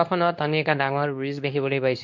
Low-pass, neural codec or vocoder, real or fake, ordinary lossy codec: 7.2 kHz; codec, 24 kHz, 0.9 kbps, WavTokenizer, medium speech release version 2; fake; MP3, 48 kbps